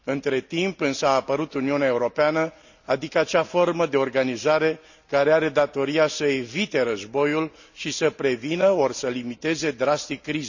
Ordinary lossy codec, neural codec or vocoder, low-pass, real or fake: none; none; 7.2 kHz; real